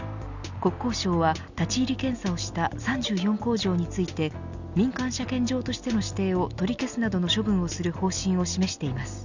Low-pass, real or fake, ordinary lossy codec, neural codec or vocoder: 7.2 kHz; real; none; none